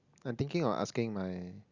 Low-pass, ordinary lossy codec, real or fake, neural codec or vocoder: 7.2 kHz; Opus, 64 kbps; real; none